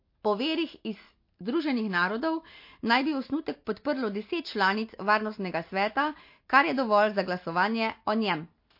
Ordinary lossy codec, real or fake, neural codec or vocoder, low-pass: MP3, 32 kbps; real; none; 5.4 kHz